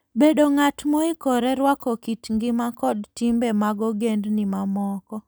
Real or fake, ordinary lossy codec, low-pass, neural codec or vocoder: real; none; none; none